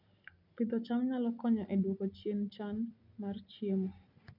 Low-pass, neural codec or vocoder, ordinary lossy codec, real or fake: 5.4 kHz; none; none; real